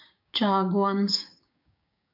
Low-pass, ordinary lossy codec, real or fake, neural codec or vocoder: 5.4 kHz; AAC, 48 kbps; fake; codec, 16 kHz, 6 kbps, DAC